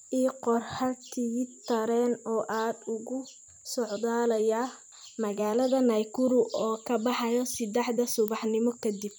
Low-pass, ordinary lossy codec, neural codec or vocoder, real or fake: none; none; vocoder, 44.1 kHz, 128 mel bands every 256 samples, BigVGAN v2; fake